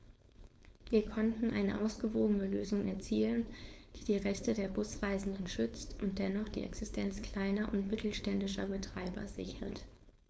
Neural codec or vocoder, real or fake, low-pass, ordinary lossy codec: codec, 16 kHz, 4.8 kbps, FACodec; fake; none; none